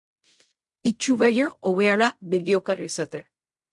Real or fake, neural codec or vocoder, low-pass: fake; codec, 16 kHz in and 24 kHz out, 0.4 kbps, LongCat-Audio-Codec, fine tuned four codebook decoder; 10.8 kHz